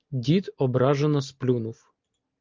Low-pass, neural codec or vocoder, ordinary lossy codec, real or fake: 7.2 kHz; none; Opus, 24 kbps; real